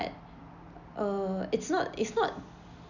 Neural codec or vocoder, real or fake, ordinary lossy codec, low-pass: none; real; none; 7.2 kHz